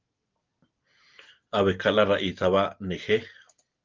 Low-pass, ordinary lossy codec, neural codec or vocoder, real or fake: 7.2 kHz; Opus, 32 kbps; none; real